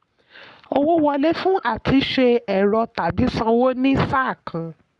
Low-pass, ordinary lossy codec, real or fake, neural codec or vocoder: 10.8 kHz; Opus, 64 kbps; fake; codec, 44.1 kHz, 7.8 kbps, Pupu-Codec